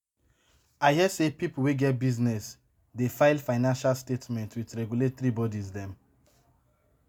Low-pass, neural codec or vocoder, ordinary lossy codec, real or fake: none; none; none; real